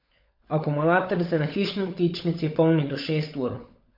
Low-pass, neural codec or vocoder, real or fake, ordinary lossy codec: 5.4 kHz; codec, 16 kHz, 16 kbps, FunCodec, trained on LibriTTS, 50 frames a second; fake; MP3, 32 kbps